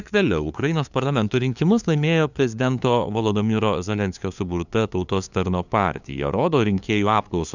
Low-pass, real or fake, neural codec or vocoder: 7.2 kHz; fake; codec, 16 kHz, 2 kbps, FunCodec, trained on LibriTTS, 25 frames a second